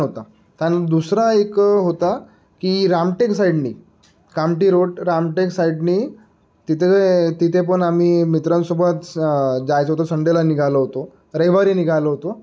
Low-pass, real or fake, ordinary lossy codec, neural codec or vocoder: none; real; none; none